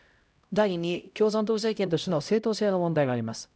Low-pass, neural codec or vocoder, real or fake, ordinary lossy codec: none; codec, 16 kHz, 0.5 kbps, X-Codec, HuBERT features, trained on LibriSpeech; fake; none